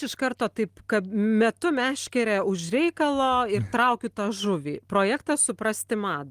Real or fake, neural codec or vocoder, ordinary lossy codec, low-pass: real; none; Opus, 24 kbps; 14.4 kHz